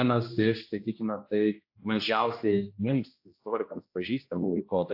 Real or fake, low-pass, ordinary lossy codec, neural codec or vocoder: fake; 5.4 kHz; AAC, 48 kbps; codec, 16 kHz, 1 kbps, X-Codec, HuBERT features, trained on general audio